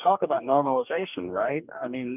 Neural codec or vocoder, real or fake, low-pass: codec, 44.1 kHz, 2.6 kbps, DAC; fake; 3.6 kHz